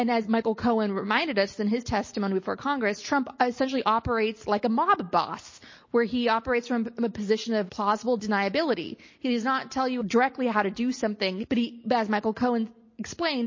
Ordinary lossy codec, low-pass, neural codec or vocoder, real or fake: MP3, 32 kbps; 7.2 kHz; none; real